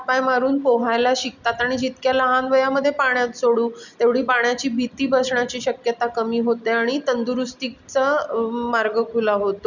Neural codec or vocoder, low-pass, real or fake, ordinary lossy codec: none; 7.2 kHz; real; none